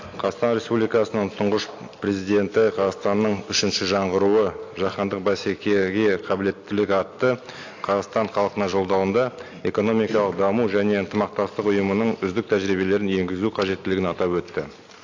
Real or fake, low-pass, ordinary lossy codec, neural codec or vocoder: real; 7.2 kHz; AAC, 48 kbps; none